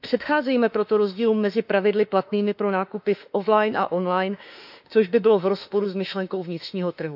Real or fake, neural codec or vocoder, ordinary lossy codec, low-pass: fake; autoencoder, 48 kHz, 32 numbers a frame, DAC-VAE, trained on Japanese speech; none; 5.4 kHz